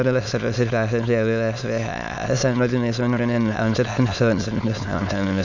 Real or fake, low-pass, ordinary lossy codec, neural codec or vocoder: fake; 7.2 kHz; none; autoencoder, 22.05 kHz, a latent of 192 numbers a frame, VITS, trained on many speakers